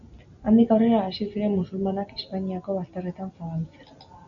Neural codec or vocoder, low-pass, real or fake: none; 7.2 kHz; real